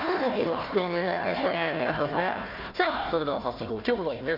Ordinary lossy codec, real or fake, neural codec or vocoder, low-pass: none; fake; codec, 16 kHz, 1 kbps, FunCodec, trained on Chinese and English, 50 frames a second; 5.4 kHz